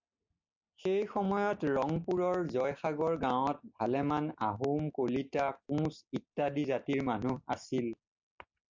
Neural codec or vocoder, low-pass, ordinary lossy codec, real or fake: none; 7.2 kHz; MP3, 64 kbps; real